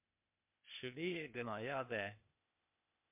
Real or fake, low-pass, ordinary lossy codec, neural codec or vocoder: fake; 3.6 kHz; MP3, 32 kbps; codec, 16 kHz, 0.8 kbps, ZipCodec